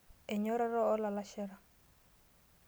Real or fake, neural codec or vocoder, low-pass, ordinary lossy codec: real; none; none; none